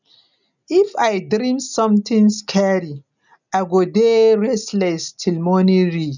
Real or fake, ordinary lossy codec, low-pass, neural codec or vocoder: real; none; 7.2 kHz; none